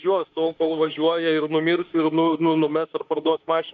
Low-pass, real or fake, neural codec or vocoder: 7.2 kHz; fake; autoencoder, 48 kHz, 32 numbers a frame, DAC-VAE, trained on Japanese speech